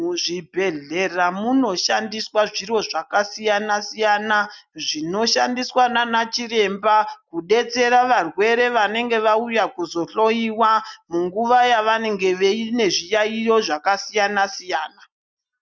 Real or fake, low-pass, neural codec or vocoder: real; 7.2 kHz; none